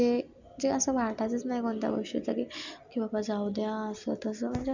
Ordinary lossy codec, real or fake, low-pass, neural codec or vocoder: none; real; 7.2 kHz; none